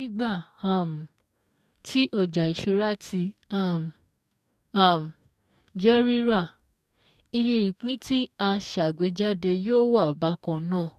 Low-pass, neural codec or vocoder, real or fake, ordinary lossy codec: 14.4 kHz; codec, 44.1 kHz, 2.6 kbps, DAC; fake; none